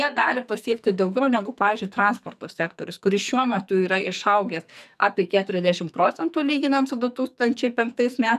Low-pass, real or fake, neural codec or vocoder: 14.4 kHz; fake; codec, 44.1 kHz, 2.6 kbps, SNAC